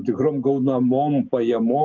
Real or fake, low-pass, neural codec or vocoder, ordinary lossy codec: real; 7.2 kHz; none; Opus, 24 kbps